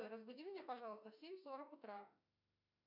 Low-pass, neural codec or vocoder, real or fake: 5.4 kHz; autoencoder, 48 kHz, 32 numbers a frame, DAC-VAE, trained on Japanese speech; fake